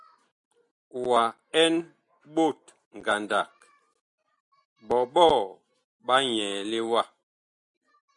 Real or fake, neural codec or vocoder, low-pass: real; none; 10.8 kHz